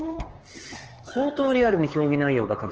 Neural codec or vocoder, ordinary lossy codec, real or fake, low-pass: codec, 16 kHz, 4 kbps, X-Codec, HuBERT features, trained on LibriSpeech; Opus, 16 kbps; fake; 7.2 kHz